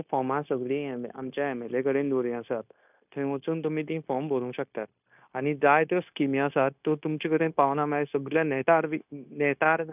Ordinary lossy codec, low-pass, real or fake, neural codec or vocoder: none; 3.6 kHz; fake; codec, 16 kHz, 0.9 kbps, LongCat-Audio-Codec